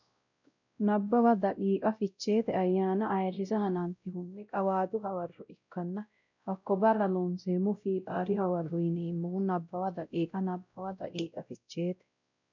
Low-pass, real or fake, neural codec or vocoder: 7.2 kHz; fake; codec, 16 kHz, 0.5 kbps, X-Codec, WavLM features, trained on Multilingual LibriSpeech